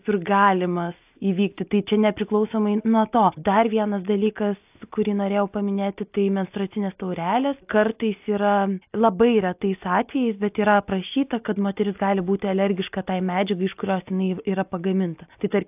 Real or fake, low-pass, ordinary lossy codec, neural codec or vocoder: real; 3.6 kHz; AAC, 32 kbps; none